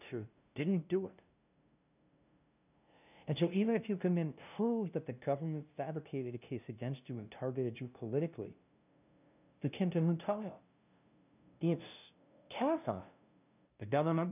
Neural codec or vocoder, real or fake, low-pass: codec, 16 kHz, 0.5 kbps, FunCodec, trained on LibriTTS, 25 frames a second; fake; 3.6 kHz